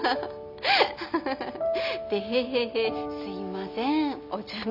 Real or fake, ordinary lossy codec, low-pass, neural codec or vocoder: real; none; 5.4 kHz; none